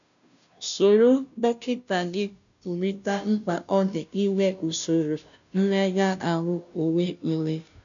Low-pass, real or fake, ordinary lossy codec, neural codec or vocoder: 7.2 kHz; fake; none; codec, 16 kHz, 0.5 kbps, FunCodec, trained on Chinese and English, 25 frames a second